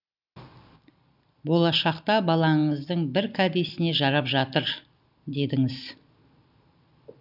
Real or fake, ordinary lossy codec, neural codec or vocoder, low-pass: real; none; none; 5.4 kHz